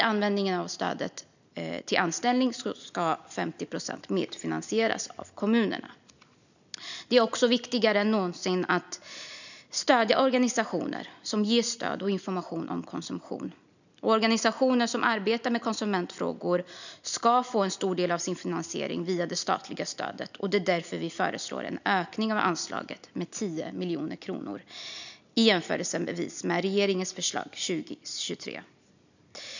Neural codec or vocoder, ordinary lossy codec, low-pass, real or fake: none; none; 7.2 kHz; real